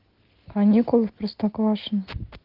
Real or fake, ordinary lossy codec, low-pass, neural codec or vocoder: real; Opus, 16 kbps; 5.4 kHz; none